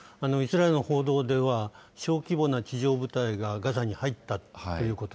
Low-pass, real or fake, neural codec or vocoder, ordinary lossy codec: none; real; none; none